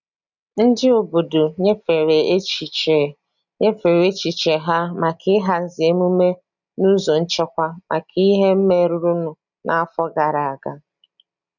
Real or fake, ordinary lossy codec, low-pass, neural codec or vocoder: real; none; 7.2 kHz; none